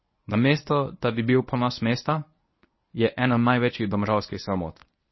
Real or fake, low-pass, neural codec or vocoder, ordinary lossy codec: fake; 7.2 kHz; codec, 24 kHz, 0.9 kbps, WavTokenizer, medium speech release version 1; MP3, 24 kbps